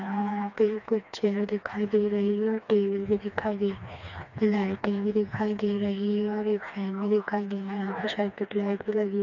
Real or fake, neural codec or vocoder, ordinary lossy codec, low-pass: fake; codec, 16 kHz, 2 kbps, FreqCodec, smaller model; none; 7.2 kHz